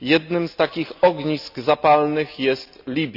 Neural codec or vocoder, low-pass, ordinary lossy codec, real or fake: none; 5.4 kHz; none; real